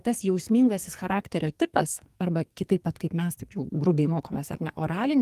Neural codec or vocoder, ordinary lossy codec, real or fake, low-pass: codec, 44.1 kHz, 2.6 kbps, SNAC; Opus, 24 kbps; fake; 14.4 kHz